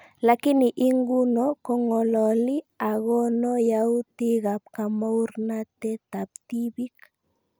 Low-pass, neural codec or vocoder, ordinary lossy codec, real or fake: none; none; none; real